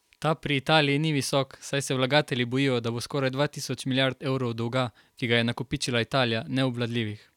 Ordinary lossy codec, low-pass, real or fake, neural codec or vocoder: none; 19.8 kHz; real; none